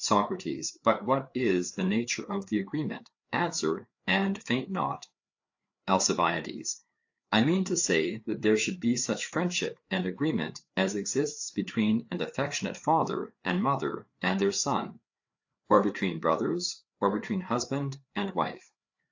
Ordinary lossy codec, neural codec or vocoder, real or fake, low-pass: AAC, 48 kbps; codec, 16 kHz, 4 kbps, FreqCodec, larger model; fake; 7.2 kHz